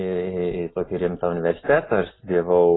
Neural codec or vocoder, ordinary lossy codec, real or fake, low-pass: none; AAC, 16 kbps; real; 7.2 kHz